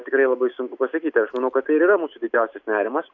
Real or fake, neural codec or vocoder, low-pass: real; none; 7.2 kHz